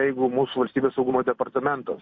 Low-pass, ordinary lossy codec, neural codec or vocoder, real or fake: 7.2 kHz; MP3, 32 kbps; none; real